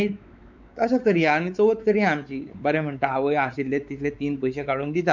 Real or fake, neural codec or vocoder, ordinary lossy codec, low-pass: fake; codec, 16 kHz, 4 kbps, X-Codec, WavLM features, trained on Multilingual LibriSpeech; none; 7.2 kHz